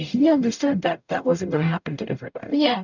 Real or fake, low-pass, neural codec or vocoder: fake; 7.2 kHz; codec, 44.1 kHz, 0.9 kbps, DAC